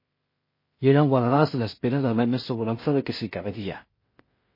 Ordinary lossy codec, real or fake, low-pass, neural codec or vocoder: MP3, 24 kbps; fake; 5.4 kHz; codec, 16 kHz in and 24 kHz out, 0.4 kbps, LongCat-Audio-Codec, two codebook decoder